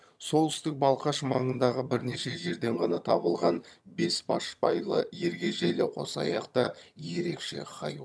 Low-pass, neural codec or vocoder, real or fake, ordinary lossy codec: none; vocoder, 22.05 kHz, 80 mel bands, HiFi-GAN; fake; none